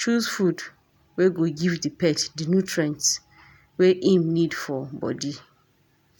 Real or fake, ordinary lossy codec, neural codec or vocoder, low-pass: real; none; none; 19.8 kHz